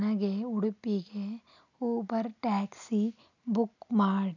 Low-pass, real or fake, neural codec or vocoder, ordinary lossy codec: 7.2 kHz; real; none; none